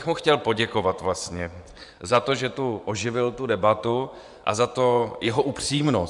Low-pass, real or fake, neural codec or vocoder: 10.8 kHz; fake; vocoder, 24 kHz, 100 mel bands, Vocos